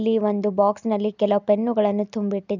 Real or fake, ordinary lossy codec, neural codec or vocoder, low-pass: real; none; none; 7.2 kHz